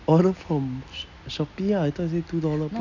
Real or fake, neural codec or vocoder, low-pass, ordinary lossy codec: real; none; 7.2 kHz; none